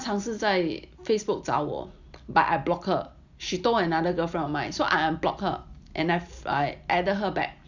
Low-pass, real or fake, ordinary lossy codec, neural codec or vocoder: 7.2 kHz; real; Opus, 64 kbps; none